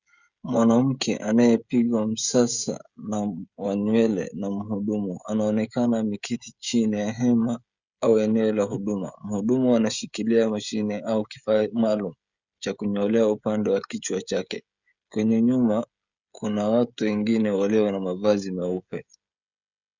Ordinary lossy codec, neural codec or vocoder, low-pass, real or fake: Opus, 64 kbps; codec, 16 kHz, 16 kbps, FreqCodec, smaller model; 7.2 kHz; fake